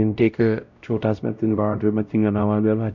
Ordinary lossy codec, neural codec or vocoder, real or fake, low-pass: none; codec, 16 kHz, 0.5 kbps, X-Codec, WavLM features, trained on Multilingual LibriSpeech; fake; 7.2 kHz